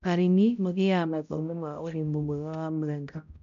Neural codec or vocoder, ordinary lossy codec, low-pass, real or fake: codec, 16 kHz, 0.5 kbps, X-Codec, HuBERT features, trained on balanced general audio; AAC, 96 kbps; 7.2 kHz; fake